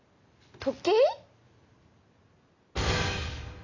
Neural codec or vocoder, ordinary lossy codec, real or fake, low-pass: none; none; real; 7.2 kHz